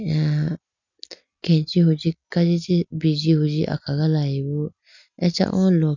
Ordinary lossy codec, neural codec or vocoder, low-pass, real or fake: none; none; 7.2 kHz; real